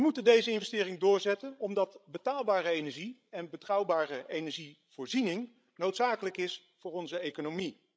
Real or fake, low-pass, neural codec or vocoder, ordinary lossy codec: fake; none; codec, 16 kHz, 16 kbps, FreqCodec, larger model; none